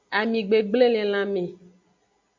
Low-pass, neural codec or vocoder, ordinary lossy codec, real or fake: 7.2 kHz; none; MP3, 48 kbps; real